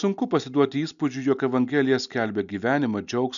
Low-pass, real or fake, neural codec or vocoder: 7.2 kHz; real; none